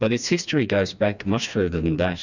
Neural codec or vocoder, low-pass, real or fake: codec, 16 kHz, 2 kbps, FreqCodec, smaller model; 7.2 kHz; fake